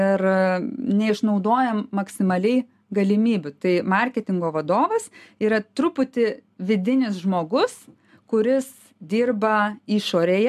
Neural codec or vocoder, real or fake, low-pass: none; real; 14.4 kHz